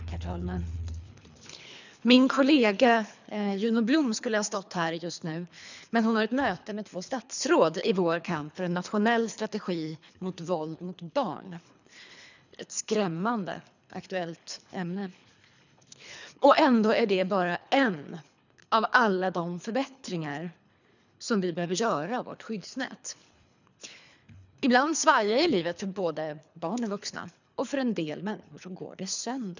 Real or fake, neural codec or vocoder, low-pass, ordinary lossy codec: fake; codec, 24 kHz, 3 kbps, HILCodec; 7.2 kHz; none